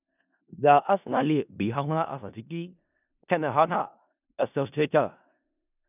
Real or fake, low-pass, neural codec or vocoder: fake; 3.6 kHz; codec, 16 kHz in and 24 kHz out, 0.4 kbps, LongCat-Audio-Codec, four codebook decoder